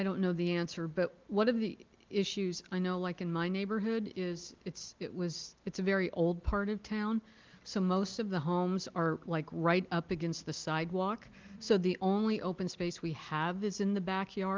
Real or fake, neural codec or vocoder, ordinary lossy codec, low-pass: real; none; Opus, 32 kbps; 7.2 kHz